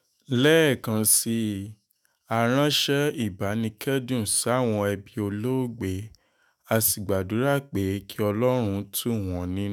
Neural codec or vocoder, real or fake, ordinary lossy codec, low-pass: autoencoder, 48 kHz, 128 numbers a frame, DAC-VAE, trained on Japanese speech; fake; none; none